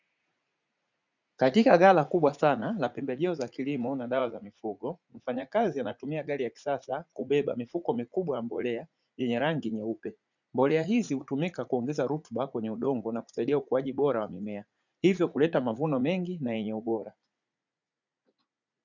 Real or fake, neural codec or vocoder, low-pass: fake; vocoder, 44.1 kHz, 80 mel bands, Vocos; 7.2 kHz